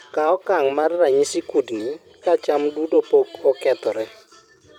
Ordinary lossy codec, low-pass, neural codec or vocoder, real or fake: none; 19.8 kHz; vocoder, 44.1 kHz, 128 mel bands every 256 samples, BigVGAN v2; fake